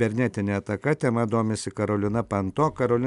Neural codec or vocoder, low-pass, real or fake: none; 10.8 kHz; real